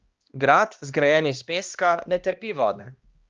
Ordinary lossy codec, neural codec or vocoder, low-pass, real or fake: Opus, 24 kbps; codec, 16 kHz, 1 kbps, X-Codec, HuBERT features, trained on balanced general audio; 7.2 kHz; fake